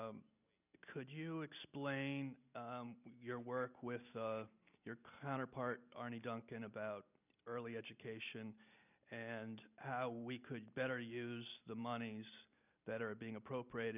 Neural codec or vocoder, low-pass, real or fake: none; 3.6 kHz; real